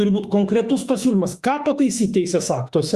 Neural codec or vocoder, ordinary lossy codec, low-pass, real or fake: autoencoder, 48 kHz, 32 numbers a frame, DAC-VAE, trained on Japanese speech; Opus, 64 kbps; 14.4 kHz; fake